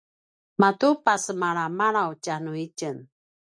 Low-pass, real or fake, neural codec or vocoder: 9.9 kHz; real; none